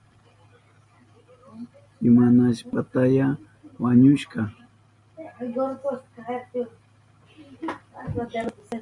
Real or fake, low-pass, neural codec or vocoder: real; 10.8 kHz; none